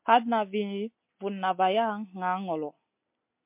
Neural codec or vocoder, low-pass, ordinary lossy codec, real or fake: none; 3.6 kHz; MP3, 32 kbps; real